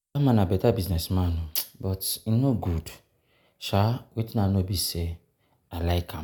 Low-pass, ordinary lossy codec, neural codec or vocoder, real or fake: none; none; none; real